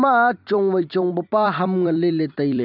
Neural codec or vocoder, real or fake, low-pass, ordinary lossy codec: vocoder, 44.1 kHz, 128 mel bands every 256 samples, BigVGAN v2; fake; 5.4 kHz; none